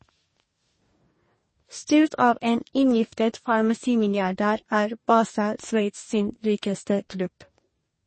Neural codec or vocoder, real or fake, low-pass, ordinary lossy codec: codec, 44.1 kHz, 2.6 kbps, DAC; fake; 9.9 kHz; MP3, 32 kbps